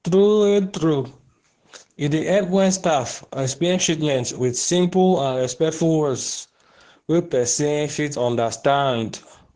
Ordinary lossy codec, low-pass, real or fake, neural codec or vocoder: Opus, 16 kbps; 9.9 kHz; fake; codec, 24 kHz, 0.9 kbps, WavTokenizer, medium speech release version 2